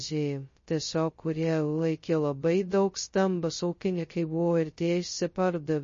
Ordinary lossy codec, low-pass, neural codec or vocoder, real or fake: MP3, 32 kbps; 7.2 kHz; codec, 16 kHz, 0.2 kbps, FocalCodec; fake